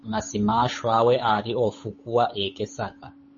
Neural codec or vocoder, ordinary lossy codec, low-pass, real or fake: codec, 16 kHz, 8 kbps, FunCodec, trained on Chinese and English, 25 frames a second; MP3, 32 kbps; 7.2 kHz; fake